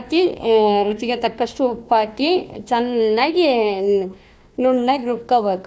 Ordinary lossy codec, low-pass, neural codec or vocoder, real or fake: none; none; codec, 16 kHz, 1 kbps, FunCodec, trained on Chinese and English, 50 frames a second; fake